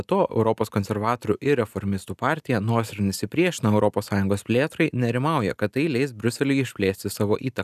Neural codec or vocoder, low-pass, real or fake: none; 14.4 kHz; real